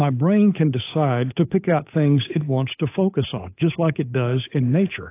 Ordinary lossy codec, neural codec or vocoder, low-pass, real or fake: AAC, 24 kbps; codec, 16 kHz, 8 kbps, FunCodec, trained on Chinese and English, 25 frames a second; 3.6 kHz; fake